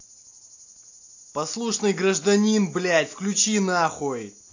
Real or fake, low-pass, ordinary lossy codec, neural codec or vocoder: real; 7.2 kHz; AAC, 48 kbps; none